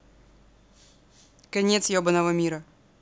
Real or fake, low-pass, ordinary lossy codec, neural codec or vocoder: real; none; none; none